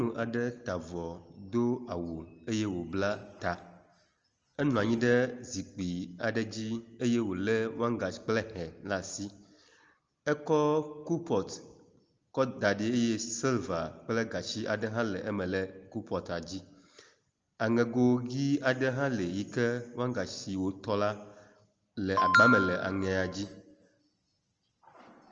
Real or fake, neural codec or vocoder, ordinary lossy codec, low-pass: real; none; Opus, 24 kbps; 7.2 kHz